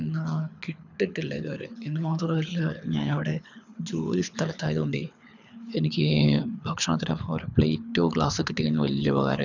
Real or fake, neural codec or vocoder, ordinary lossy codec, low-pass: fake; codec, 24 kHz, 6 kbps, HILCodec; none; 7.2 kHz